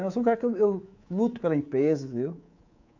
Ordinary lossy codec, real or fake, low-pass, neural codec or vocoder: AAC, 48 kbps; fake; 7.2 kHz; codec, 24 kHz, 3.1 kbps, DualCodec